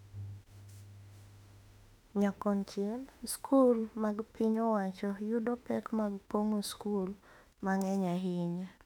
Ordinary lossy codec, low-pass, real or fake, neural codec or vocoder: none; 19.8 kHz; fake; autoencoder, 48 kHz, 32 numbers a frame, DAC-VAE, trained on Japanese speech